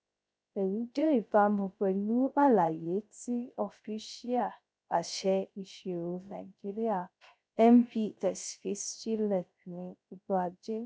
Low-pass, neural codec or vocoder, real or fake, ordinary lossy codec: none; codec, 16 kHz, 0.3 kbps, FocalCodec; fake; none